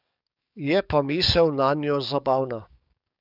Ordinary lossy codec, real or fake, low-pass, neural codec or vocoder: none; fake; 5.4 kHz; codec, 44.1 kHz, 7.8 kbps, DAC